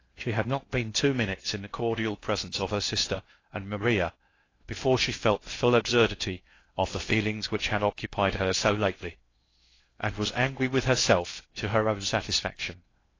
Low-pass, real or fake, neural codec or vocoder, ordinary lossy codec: 7.2 kHz; fake; codec, 16 kHz in and 24 kHz out, 0.6 kbps, FocalCodec, streaming, 2048 codes; AAC, 32 kbps